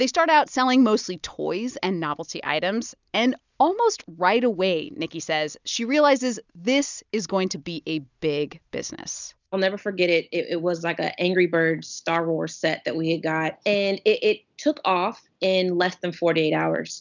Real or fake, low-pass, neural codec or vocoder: real; 7.2 kHz; none